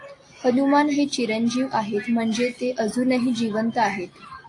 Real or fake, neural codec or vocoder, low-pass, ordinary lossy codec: real; none; 10.8 kHz; AAC, 48 kbps